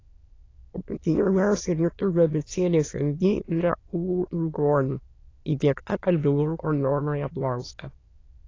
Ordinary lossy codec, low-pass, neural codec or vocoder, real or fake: AAC, 32 kbps; 7.2 kHz; autoencoder, 22.05 kHz, a latent of 192 numbers a frame, VITS, trained on many speakers; fake